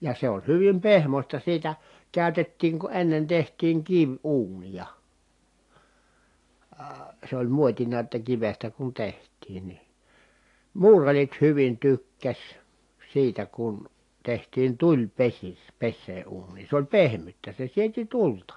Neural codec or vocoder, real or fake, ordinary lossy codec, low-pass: none; real; AAC, 48 kbps; 10.8 kHz